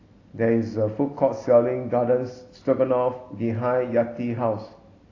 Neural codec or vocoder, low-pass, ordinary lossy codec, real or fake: none; 7.2 kHz; AAC, 32 kbps; real